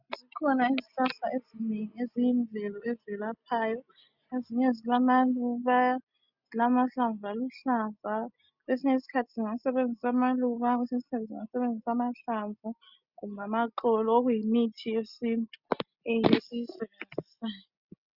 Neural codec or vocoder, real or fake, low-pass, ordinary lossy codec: none; real; 5.4 kHz; Opus, 64 kbps